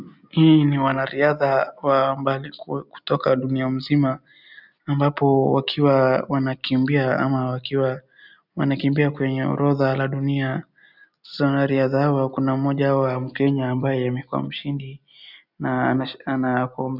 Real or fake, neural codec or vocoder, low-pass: real; none; 5.4 kHz